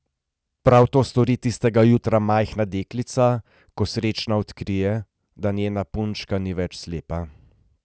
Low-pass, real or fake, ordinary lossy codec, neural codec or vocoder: none; real; none; none